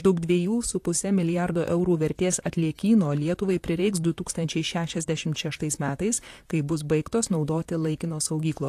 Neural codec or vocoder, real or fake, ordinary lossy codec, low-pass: vocoder, 44.1 kHz, 128 mel bands, Pupu-Vocoder; fake; AAC, 64 kbps; 14.4 kHz